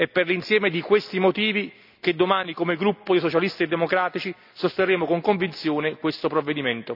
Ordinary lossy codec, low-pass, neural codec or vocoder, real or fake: none; 5.4 kHz; none; real